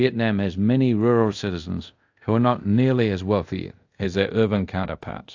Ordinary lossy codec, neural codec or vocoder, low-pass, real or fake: AAC, 48 kbps; codec, 24 kHz, 0.5 kbps, DualCodec; 7.2 kHz; fake